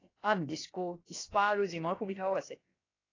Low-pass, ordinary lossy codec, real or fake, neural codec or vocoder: 7.2 kHz; AAC, 32 kbps; fake; codec, 16 kHz, about 1 kbps, DyCAST, with the encoder's durations